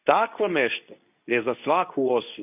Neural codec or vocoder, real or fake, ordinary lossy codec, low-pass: codec, 24 kHz, 0.9 kbps, WavTokenizer, medium speech release version 2; fake; none; 3.6 kHz